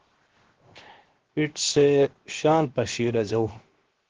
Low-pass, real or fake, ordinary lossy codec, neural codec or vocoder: 7.2 kHz; fake; Opus, 16 kbps; codec, 16 kHz, 0.7 kbps, FocalCodec